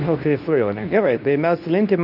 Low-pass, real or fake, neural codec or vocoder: 5.4 kHz; fake; codec, 24 kHz, 0.9 kbps, WavTokenizer, medium speech release version 2